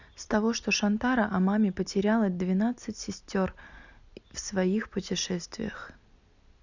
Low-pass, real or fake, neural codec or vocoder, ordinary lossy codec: 7.2 kHz; real; none; none